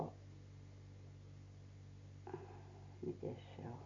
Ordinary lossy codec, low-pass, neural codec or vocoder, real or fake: MP3, 48 kbps; 7.2 kHz; none; real